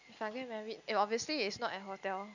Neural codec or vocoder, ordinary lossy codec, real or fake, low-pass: none; none; real; 7.2 kHz